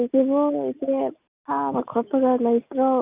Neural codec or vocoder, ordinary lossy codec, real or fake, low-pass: none; Opus, 64 kbps; real; 3.6 kHz